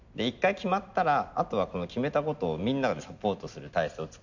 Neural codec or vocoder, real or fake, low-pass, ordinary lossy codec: none; real; 7.2 kHz; none